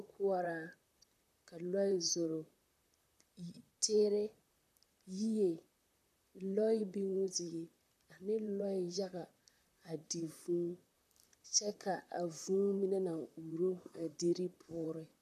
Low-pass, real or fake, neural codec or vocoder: 14.4 kHz; fake; vocoder, 44.1 kHz, 128 mel bands, Pupu-Vocoder